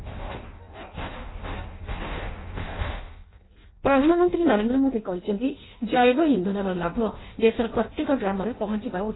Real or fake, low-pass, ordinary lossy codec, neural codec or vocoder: fake; 7.2 kHz; AAC, 16 kbps; codec, 16 kHz in and 24 kHz out, 0.6 kbps, FireRedTTS-2 codec